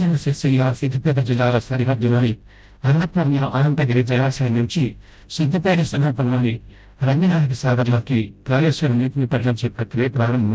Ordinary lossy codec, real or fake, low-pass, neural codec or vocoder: none; fake; none; codec, 16 kHz, 0.5 kbps, FreqCodec, smaller model